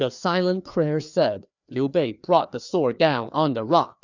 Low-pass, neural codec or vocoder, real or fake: 7.2 kHz; codec, 16 kHz, 2 kbps, FreqCodec, larger model; fake